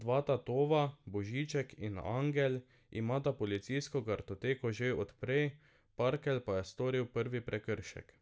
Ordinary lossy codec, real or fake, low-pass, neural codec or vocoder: none; real; none; none